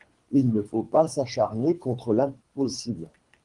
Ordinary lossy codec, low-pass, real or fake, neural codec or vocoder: Opus, 24 kbps; 10.8 kHz; fake; codec, 24 kHz, 3 kbps, HILCodec